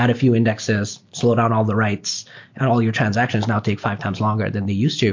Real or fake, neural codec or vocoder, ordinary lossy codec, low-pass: real; none; MP3, 48 kbps; 7.2 kHz